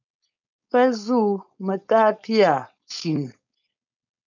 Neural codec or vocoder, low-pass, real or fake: codec, 16 kHz, 4.8 kbps, FACodec; 7.2 kHz; fake